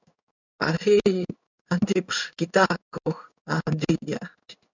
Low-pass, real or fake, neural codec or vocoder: 7.2 kHz; fake; codec, 16 kHz in and 24 kHz out, 1 kbps, XY-Tokenizer